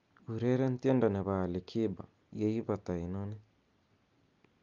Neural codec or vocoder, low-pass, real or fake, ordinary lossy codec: none; 7.2 kHz; real; Opus, 32 kbps